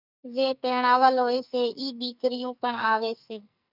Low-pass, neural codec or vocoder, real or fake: 5.4 kHz; codec, 44.1 kHz, 2.6 kbps, SNAC; fake